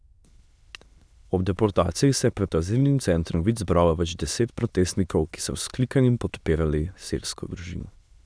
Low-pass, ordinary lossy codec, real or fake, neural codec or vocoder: none; none; fake; autoencoder, 22.05 kHz, a latent of 192 numbers a frame, VITS, trained on many speakers